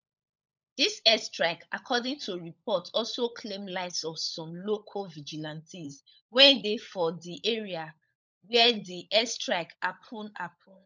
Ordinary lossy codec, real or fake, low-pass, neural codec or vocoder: none; fake; 7.2 kHz; codec, 16 kHz, 16 kbps, FunCodec, trained on LibriTTS, 50 frames a second